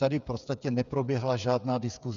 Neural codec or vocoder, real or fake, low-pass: codec, 16 kHz, 8 kbps, FreqCodec, smaller model; fake; 7.2 kHz